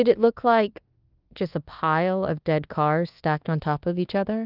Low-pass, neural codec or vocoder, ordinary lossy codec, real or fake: 5.4 kHz; codec, 24 kHz, 1.2 kbps, DualCodec; Opus, 32 kbps; fake